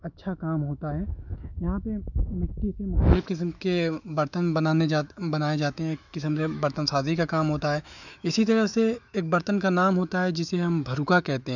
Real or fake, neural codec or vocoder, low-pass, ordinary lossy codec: fake; autoencoder, 48 kHz, 128 numbers a frame, DAC-VAE, trained on Japanese speech; 7.2 kHz; none